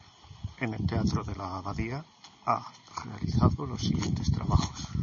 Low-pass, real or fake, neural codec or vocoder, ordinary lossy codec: 7.2 kHz; real; none; MP3, 32 kbps